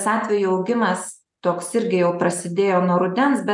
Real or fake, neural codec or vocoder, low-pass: real; none; 10.8 kHz